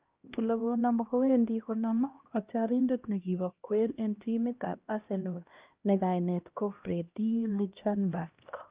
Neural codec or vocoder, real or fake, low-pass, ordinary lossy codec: codec, 16 kHz, 1 kbps, X-Codec, HuBERT features, trained on LibriSpeech; fake; 3.6 kHz; Opus, 24 kbps